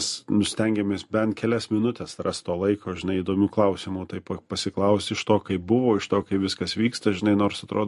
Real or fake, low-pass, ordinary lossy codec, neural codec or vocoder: real; 14.4 kHz; MP3, 48 kbps; none